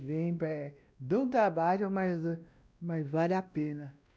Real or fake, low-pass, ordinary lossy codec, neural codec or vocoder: fake; none; none; codec, 16 kHz, 1 kbps, X-Codec, WavLM features, trained on Multilingual LibriSpeech